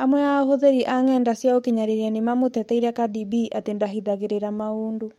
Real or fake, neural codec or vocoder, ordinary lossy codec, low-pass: fake; codec, 44.1 kHz, 7.8 kbps, DAC; MP3, 64 kbps; 19.8 kHz